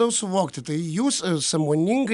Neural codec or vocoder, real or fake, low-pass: none; real; 10.8 kHz